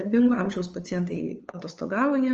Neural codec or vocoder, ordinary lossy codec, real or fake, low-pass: codec, 16 kHz, 8 kbps, FunCodec, trained on LibriTTS, 25 frames a second; Opus, 24 kbps; fake; 7.2 kHz